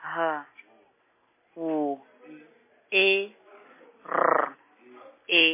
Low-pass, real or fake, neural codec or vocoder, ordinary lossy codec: 3.6 kHz; real; none; MP3, 16 kbps